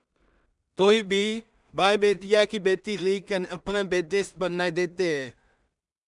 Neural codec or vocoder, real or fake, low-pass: codec, 16 kHz in and 24 kHz out, 0.4 kbps, LongCat-Audio-Codec, two codebook decoder; fake; 10.8 kHz